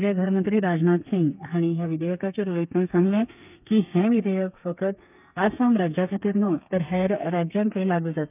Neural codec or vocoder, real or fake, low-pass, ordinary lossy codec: codec, 32 kHz, 1.9 kbps, SNAC; fake; 3.6 kHz; none